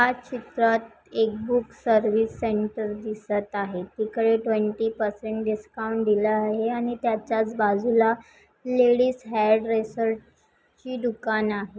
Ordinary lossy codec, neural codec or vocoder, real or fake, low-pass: none; none; real; none